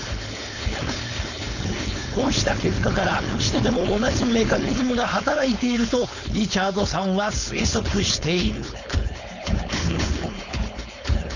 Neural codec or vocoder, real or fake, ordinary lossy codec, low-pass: codec, 16 kHz, 4.8 kbps, FACodec; fake; none; 7.2 kHz